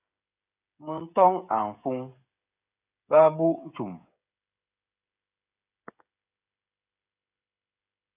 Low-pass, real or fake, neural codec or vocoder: 3.6 kHz; fake; codec, 16 kHz, 16 kbps, FreqCodec, smaller model